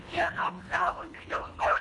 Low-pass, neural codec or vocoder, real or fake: 10.8 kHz; codec, 24 kHz, 1.5 kbps, HILCodec; fake